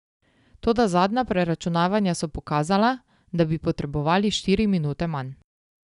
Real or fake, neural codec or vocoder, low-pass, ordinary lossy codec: real; none; 10.8 kHz; none